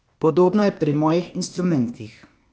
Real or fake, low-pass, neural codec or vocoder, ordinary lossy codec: fake; none; codec, 16 kHz, 0.8 kbps, ZipCodec; none